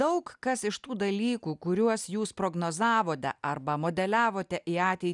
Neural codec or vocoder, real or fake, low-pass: none; real; 10.8 kHz